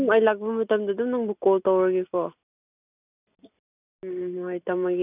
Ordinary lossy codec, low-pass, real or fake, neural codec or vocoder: none; 3.6 kHz; real; none